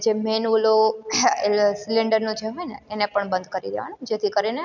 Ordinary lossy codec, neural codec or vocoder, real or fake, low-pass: none; none; real; 7.2 kHz